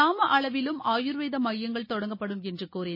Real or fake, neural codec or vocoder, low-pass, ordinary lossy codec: real; none; 5.4 kHz; MP3, 24 kbps